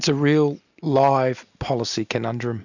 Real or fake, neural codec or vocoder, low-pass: real; none; 7.2 kHz